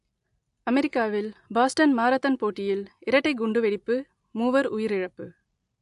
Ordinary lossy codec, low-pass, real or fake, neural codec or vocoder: AAC, 64 kbps; 10.8 kHz; real; none